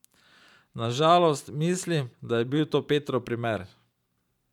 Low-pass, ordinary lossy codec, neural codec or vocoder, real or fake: 19.8 kHz; none; vocoder, 44.1 kHz, 128 mel bands every 512 samples, BigVGAN v2; fake